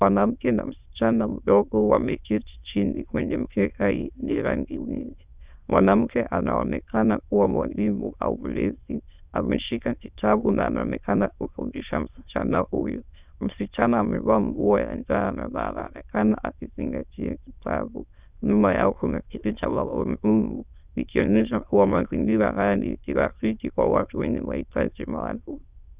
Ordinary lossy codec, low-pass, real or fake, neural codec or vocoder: Opus, 64 kbps; 3.6 kHz; fake; autoencoder, 22.05 kHz, a latent of 192 numbers a frame, VITS, trained on many speakers